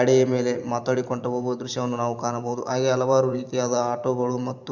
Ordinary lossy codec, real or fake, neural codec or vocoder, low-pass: none; fake; vocoder, 44.1 kHz, 128 mel bands every 512 samples, BigVGAN v2; 7.2 kHz